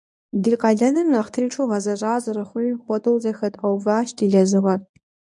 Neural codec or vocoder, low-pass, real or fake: codec, 24 kHz, 0.9 kbps, WavTokenizer, medium speech release version 1; 10.8 kHz; fake